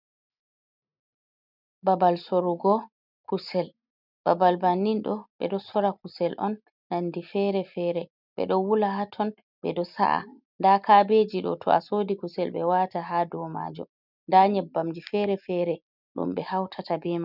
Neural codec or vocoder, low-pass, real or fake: none; 5.4 kHz; real